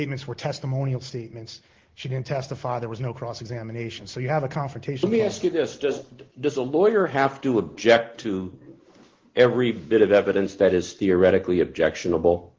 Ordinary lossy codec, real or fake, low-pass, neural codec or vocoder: Opus, 16 kbps; real; 7.2 kHz; none